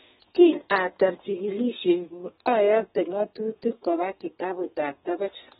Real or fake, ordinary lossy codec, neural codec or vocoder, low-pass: fake; AAC, 16 kbps; codec, 32 kHz, 1.9 kbps, SNAC; 14.4 kHz